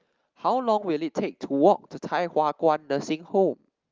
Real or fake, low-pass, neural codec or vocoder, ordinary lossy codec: real; 7.2 kHz; none; Opus, 32 kbps